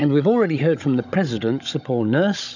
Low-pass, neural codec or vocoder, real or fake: 7.2 kHz; codec, 16 kHz, 16 kbps, FunCodec, trained on Chinese and English, 50 frames a second; fake